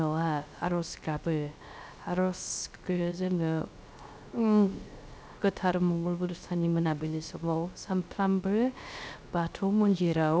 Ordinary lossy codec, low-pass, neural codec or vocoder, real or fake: none; none; codec, 16 kHz, 0.3 kbps, FocalCodec; fake